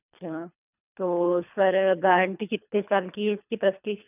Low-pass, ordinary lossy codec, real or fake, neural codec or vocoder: 3.6 kHz; none; fake; codec, 24 kHz, 3 kbps, HILCodec